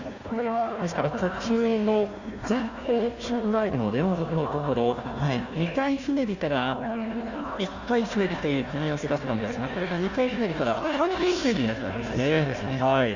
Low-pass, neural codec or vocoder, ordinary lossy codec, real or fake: 7.2 kHz; codec, 16 kHz, 1 kbps, FunCodec, trained on Chinese and English, 50 frames a second; Opus, 64 kbps; fake